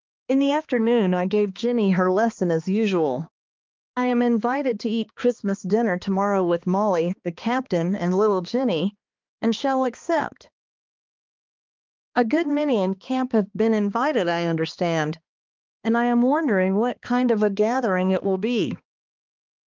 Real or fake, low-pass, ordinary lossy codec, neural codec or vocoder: fake; 7.2 kHz; Opus, 24 kbps; codec, 16 kHz, 2 kbps, X-Codec, HuBERT features, trained on balanced general audio